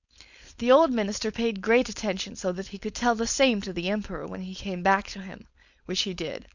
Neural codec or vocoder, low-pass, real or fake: codec, 16 kHz, 4.8 kbps, FACodec; 7.2 kHz; fake